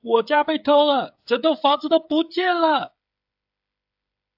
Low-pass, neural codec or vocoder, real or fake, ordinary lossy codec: 5.4 kHz; codec, 16 kHz, 8 kbps, FreqCodec, smaller model; fake; AAC, 48 kbps